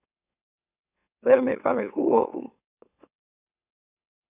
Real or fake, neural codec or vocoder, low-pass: fake; autoencoder, 44.1 kHz, a latent of 192 numbers a frame, MeloTTS; 3.6 kHz